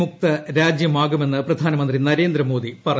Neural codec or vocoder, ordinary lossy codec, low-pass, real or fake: none; none; none; real